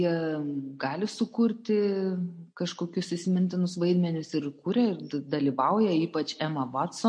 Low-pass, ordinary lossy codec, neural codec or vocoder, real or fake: 9.9 kHz; MP3, 48 kbps; none; real